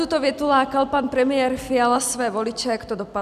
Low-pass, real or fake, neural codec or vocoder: 14.4 kHz; real; none